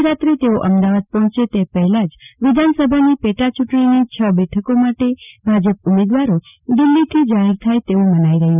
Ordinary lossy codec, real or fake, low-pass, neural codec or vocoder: none; real; 3.6 kHz; none